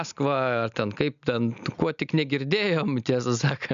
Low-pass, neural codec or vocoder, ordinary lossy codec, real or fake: 7.2 kHz; none; MP3, 96 kbps; real